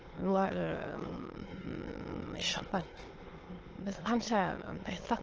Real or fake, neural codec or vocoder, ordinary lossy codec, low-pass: fake; autoencoder, 22.05 kHz, a latent of 192 numbers a frame, VITS, trained on many speakers; Opus, 16 kbps; 7.2 kHz